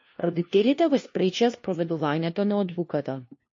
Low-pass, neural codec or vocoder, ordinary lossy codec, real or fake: 7.2 kHz; codec, 16 kHz, 1 kbps, FunCodec, trained on LibriTTS, 50 frames a second; MP3, 32 kbps; fake